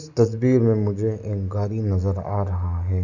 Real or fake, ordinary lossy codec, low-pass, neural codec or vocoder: real; none; 7.2 kHz; none